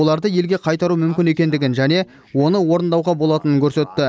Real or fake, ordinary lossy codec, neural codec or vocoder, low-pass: real; none; none; none